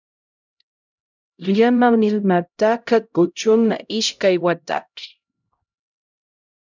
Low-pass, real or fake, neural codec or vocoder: 7.2 kHz; fake; codec, 16 kHz, 0.5 kbps, X-Codec, HuBERT features, trained on LibriSpeech